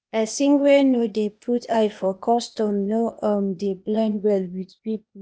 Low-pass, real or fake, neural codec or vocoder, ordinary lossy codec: none; fake; codec, 16 kHz, 0.8 kbps, ZipCodec; none